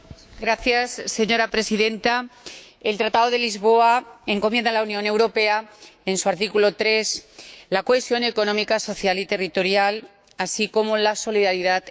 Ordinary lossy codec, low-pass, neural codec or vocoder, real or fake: none; none; codec, 16 kHz, 6 kbps, DAC; fake